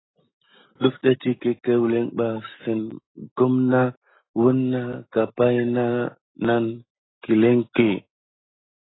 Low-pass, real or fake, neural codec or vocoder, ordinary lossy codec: 7.2 kHz; real; none; AAC, 16 kbps